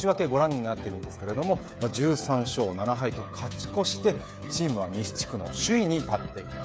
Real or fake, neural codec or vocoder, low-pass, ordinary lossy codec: fake; codec, 16 kHz, 8 kbps, FreqCodec, smaller model; none; none